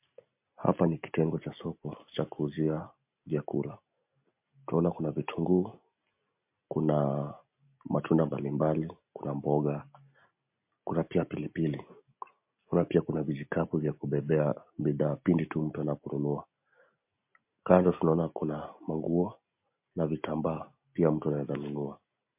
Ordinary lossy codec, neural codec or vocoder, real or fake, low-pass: MP3, 32 kbps; none; real; 3.6 kHz